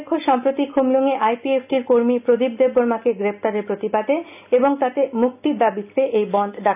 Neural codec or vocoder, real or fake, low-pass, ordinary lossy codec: none; real; 3.6 kHz; none